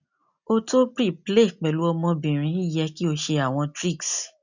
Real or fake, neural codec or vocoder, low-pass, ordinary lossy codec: real; none; 7.2 kHz; none